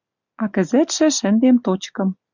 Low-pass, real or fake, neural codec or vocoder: 7.2 kHz; real; none